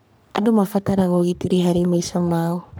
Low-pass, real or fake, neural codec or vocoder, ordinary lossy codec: none; fake; codec, 44.1 kHz, 3.4 kbps, Pupu-Codec; none